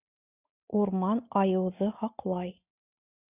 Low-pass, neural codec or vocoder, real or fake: 3.6 kHz; none; real